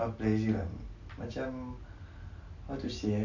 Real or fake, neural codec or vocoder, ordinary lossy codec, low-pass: real; none; none; 7.2 kHz